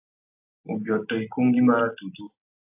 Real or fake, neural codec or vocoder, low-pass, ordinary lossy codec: real; none; 3.6 kHz; AAC, 32 kbps